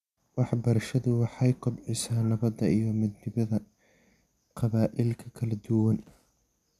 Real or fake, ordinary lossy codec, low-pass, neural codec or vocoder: real; none; 14.4 kHz; none